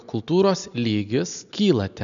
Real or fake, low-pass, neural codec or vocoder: real; 7.2 kHz; none